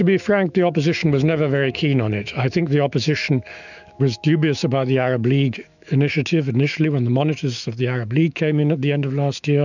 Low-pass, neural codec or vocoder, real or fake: 7.2 kHz; autoencoder, 48 kHz, 128 numbers a frame, DAC-VAE, trained on Japanese speech; fake